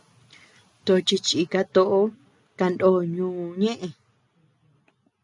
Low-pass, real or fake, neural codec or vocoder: 10.8 kHz; real; none